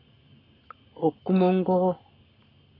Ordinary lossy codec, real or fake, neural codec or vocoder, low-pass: AAC, 24 kbps; fake; codec, 44.1 kHz, 7.8 kbps, Pupu-Codec; 5.4 kHz